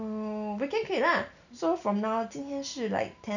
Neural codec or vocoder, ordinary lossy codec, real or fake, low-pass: none; none; real; 7.2 kHz